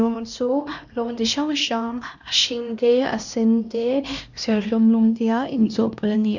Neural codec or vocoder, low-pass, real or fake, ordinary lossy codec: codec, 16 kHz, 1 kbps, X-Codec, HuBERT features, trained on LibriSpeech; 7.2 kHz; fake; none